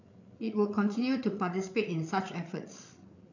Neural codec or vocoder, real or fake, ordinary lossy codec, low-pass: codec, 16 kHz, 8 kbps, FreqCodec, larger model; fake; none; 7.2 kHz